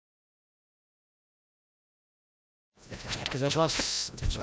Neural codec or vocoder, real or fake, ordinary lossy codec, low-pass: codec, 16 kHz, 0.5 kbps, FreqCodec, larger model; fake; none; none